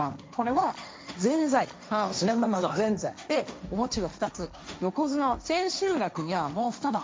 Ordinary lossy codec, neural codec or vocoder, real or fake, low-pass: none; codec, 16 kHz, 1.1 kbps, Voila-Tokenizer; fake; none